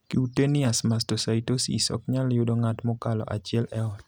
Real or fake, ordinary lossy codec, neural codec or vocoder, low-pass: real; none; none; none